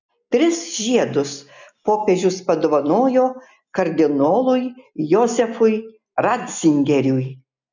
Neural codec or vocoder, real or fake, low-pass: none; real; 7.2 kHz